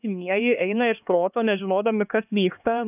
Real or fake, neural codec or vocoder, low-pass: fake; codec, 16 kHz, 2 kbps, X-Codec, HuBERT features, trained on LibriSpeech; 3.6 kHz